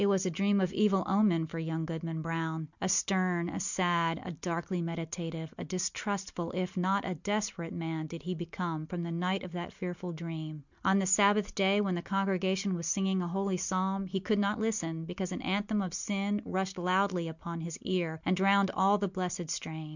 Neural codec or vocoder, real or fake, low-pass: none; real; 7.2 kHz